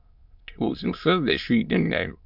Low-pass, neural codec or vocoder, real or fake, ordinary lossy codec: 5.4 kHz; autoencoder, 22.05 kHz, a latent of 192 numbers a frame, VITS, trained on many speakers; fake; MP3, 48 kbps